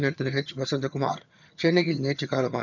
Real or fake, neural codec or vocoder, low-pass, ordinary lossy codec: fake; vocoder, 22.05 kHz, 80 mel bands, HiFi-GAN; 7.2 kHz; none